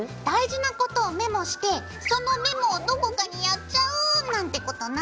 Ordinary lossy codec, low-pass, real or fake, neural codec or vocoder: none; none; real; none